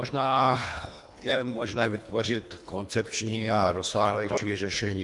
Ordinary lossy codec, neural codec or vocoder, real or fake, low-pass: MP3, 64 kbps; codec, 24 kHz, 1.5 kbps, HILCodec; fake; 10.8 kHz